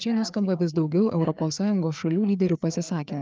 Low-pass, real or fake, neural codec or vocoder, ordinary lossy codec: 7.2 kHz; fake; codec, 16 kHz, 4 kbps, FreqCodec, larger model; Opus, 24 kbps